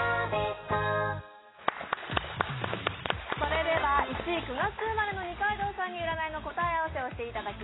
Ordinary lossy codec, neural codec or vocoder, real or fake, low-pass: AAC, 16 kbps; none; real; 7.2 kHz